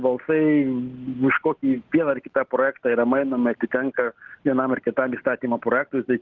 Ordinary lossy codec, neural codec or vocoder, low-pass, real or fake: Opus, 16 kbps; none; 7.2 kHz; real